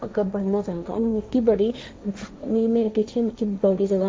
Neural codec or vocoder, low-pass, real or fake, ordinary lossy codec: codec, 16 kHz, 1.1 kbps, Voila-Tokenizer; none; fake; none